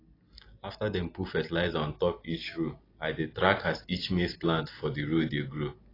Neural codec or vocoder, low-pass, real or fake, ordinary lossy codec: none; 5.4 kHz; real; AAC, 24 kbps